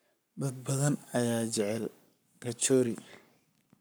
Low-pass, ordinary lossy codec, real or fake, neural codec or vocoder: none; none; fake; codec, 44.1 kHz, 7.8 kbps, Pupu-Codec